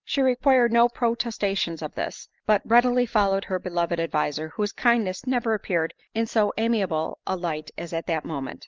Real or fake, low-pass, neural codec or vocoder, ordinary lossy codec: real; 7.2 kHz; none; Opus, 32 kbps